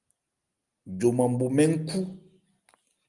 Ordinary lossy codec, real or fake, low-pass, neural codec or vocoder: Opus, 32 kbps; real; 10.8 kHz; none